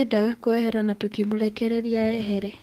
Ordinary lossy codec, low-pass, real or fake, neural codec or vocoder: Opus, 24 kbps; 14.4 kHz; fake; codec, 32 kHz, 1.9 kbps, SNAC